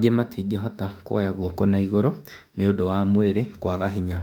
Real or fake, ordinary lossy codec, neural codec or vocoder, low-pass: fake; none; autoencoder, 48 kHz, 32 numbers a frame, DAC-VAE, trained on Japanese speech; 19.8 kHz